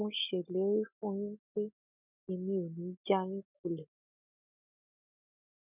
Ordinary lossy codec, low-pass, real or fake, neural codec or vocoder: none; 3.6 kHz; real; none